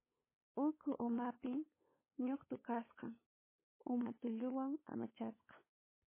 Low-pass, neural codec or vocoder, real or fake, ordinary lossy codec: 3.6 kHz; codec, 16 kHz, 8 kbps, FunCodec, trained on LibriTTS, 25 frames a second; fake; MP3, 16 kbps